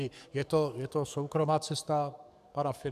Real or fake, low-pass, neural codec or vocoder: fake; 14.4 kHz; codec, 44.1 kHz, 7.8 kbps, DAC